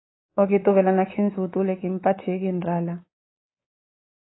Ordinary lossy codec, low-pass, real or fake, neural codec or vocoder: AAC, 16 kbps; 7.2 kHz; fake; codec, 24 kHz, 3.1 kbps, DualCodec